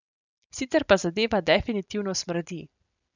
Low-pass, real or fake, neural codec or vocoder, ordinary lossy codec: 7.2 kHz; real; none; none